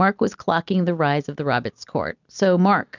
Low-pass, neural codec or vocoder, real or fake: 7.2 kHz; none; real